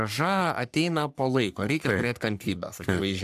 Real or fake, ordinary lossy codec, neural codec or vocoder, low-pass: fake; AAC, 96 kbps; codec, 44.1 kHz, 3.4 kbps, Pupu-Codec; 14.4 kHz